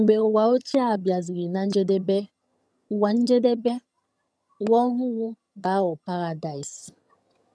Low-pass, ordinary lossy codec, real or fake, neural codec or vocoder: none; none; fake; vocoder, 22.05 kHz, 80 mel bands, HiFi-GAN